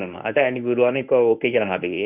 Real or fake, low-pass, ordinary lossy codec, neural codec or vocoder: fake; 3.6 kHz; none; codec, 24 kHz, 0.9 kbps, WavTokenizer, medium speech release version 1